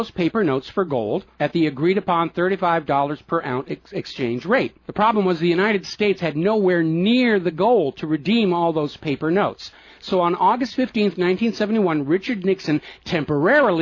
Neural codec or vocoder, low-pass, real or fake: none; 7.2 kHz; real